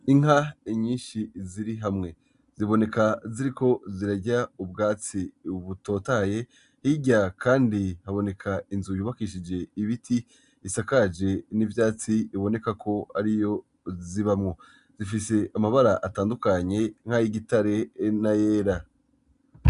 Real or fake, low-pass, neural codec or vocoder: real; 10.8 kHz; none